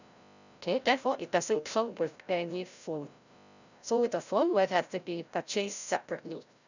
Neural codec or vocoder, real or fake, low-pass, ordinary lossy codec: codec, 16 kHz, 0.5 kbps, FreqCodec, larger model; fake; 7.2 kHz; none